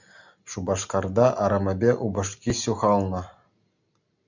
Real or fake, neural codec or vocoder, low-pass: real; none; 7.2 kHz